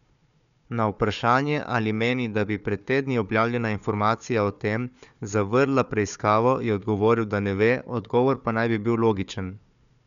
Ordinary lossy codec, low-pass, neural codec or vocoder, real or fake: none; 7.2 kHz; codec, 16 kHz, 4 kbps, FunCodec, trained on Chinese and English, 50 frames a second; fake